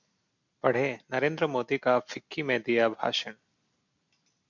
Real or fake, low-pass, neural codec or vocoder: real; 7.2 kHz; none